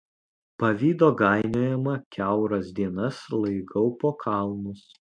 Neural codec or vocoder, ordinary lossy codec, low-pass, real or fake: none; MP3, 64 kbps; 9.9 kHz; real